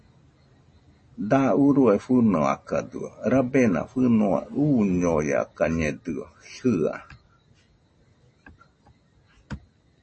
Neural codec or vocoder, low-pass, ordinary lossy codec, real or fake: none; 10.8 kHz; MP3, 32 kbps; real